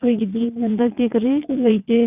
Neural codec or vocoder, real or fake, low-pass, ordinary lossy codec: vocoder, 22.05 kHz, 80 mel bands, WaveNeXt; fake; 3.6 kHz; none